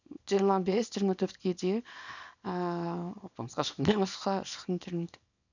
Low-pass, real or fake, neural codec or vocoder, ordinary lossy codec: 7.2 kHz; fake; codec, 24 kHz, 0.9 kbps, WavTokenizer, small release; none